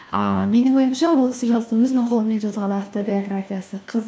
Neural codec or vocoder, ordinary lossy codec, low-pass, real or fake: codec, 16 kHz, 1 kbps, FunCodec, trained on LibriTTS, 50 frames a second; none; none; fake